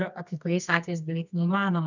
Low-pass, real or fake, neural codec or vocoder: 7.2 kHz; fake; codec, 24 kHz, 0.9 kbps, WavTokenizer, medium music audio release